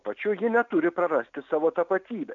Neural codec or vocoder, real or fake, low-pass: none; real; 7.2 kHz